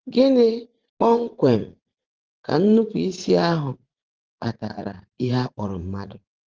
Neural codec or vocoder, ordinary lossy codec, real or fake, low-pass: vocoder, 44.1 kHz, 80 mel bands, Vocos; Opus, 16 kbps; fake; 7.2 kHz